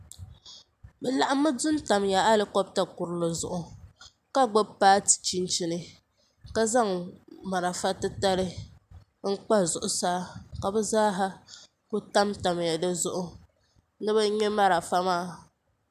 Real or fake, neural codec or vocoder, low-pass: real; none; 14.4 kHz